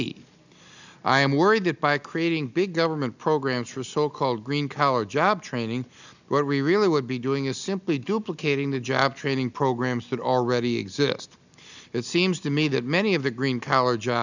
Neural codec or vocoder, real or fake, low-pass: none; real; 7.2 kHz